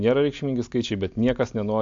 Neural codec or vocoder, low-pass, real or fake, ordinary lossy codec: none; 7.2 kHz; real; AAC, 64 kbps